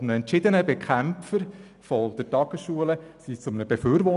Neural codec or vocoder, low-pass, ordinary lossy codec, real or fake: none; 10.8 kHz; none; real